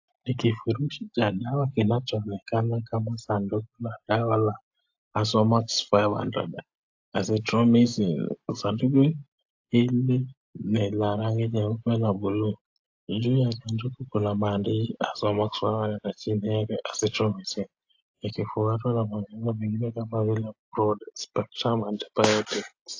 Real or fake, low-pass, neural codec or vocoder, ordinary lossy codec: real; 7.2 kHz; none; AAC, 48 kbps